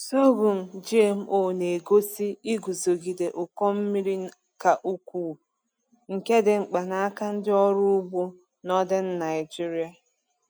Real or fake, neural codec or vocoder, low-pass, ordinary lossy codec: real; none; none; none